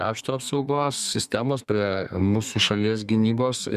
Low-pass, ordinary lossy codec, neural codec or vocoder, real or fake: 14.4 kHz; Opus, 64 kbps; codec, 32 kHz, 1.9 kbps, SNAC; fake